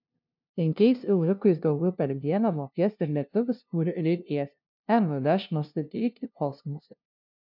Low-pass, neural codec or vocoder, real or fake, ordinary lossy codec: 5.4 kHz; codec, 16 kHz, 0.5 kbps, FunCodec, trained on LibriTTS, 25 frames a second; fake; MP3, 48 kbps